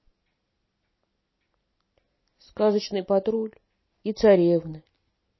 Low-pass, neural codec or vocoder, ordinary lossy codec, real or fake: 7.2 kHz; none; MP3, 24 kbps; real